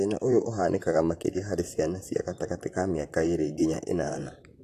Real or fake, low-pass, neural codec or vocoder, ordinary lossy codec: fake; 14.4 kHz; vocoder, 44.1 kHz, 128 mel bands, Pupu-Vocoder; AAC, 64 kbps